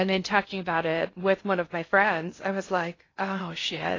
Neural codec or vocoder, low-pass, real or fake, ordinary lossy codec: codec, 16 kHz in and 24 kHz out, 0.6 kbps, FocalCodec, streaming, 2048 codes; 7.2 kHz; fake; AAC, 32 kbps